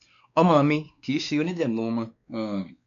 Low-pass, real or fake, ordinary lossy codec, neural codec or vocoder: 7.2 kHz; fake; none; codec, 16 kHz, 2 kbps, X-Codec, WavLM features, trained on Multilingual LibriSpeech